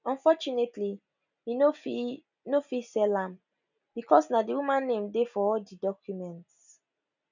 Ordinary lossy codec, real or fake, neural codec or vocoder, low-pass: none; real; none; 7.2 kHz